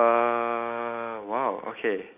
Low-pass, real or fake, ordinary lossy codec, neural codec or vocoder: 3.6 kHz; real; none; none